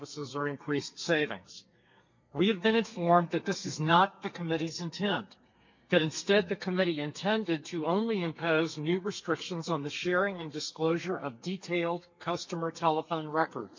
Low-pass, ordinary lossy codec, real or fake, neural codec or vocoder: 7.2 kHz; MP3, 48 kbps; fake; codec, 32 kHz, 1.9 kbps, SNAC